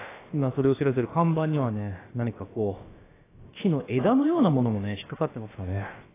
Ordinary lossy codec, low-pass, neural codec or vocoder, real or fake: AAC, 16 kbps; 3.6 kHz; codec, 16 kHz, about 1 kbps, DyCAST, with the encoder's durations; fake